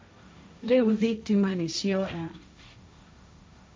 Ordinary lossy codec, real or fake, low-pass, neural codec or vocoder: none; fake; none; codec, 16 kHz, 1.1 kbps, Voila-Tokenizer